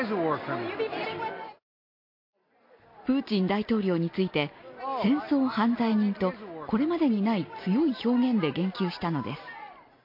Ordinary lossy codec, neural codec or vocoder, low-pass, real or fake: MP3, 32 kbps; none; 5.4 kHz; real